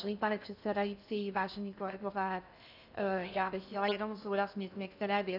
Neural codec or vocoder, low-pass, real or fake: codec, 16 kHz in and 24 kHz out, 0.6 kbps, FocalCodec, streaming, 2048 codes; 5.4 kHz; fake